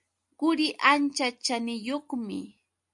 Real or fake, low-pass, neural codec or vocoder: real; 10.8 kHz; none